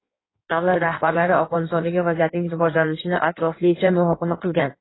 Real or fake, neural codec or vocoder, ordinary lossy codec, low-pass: fake; codec, 16 kHz in and 24 kHz out, 1.1 kbps, FireRedTTS-2 codec; AAC, 16 kbps; 7.2 kHz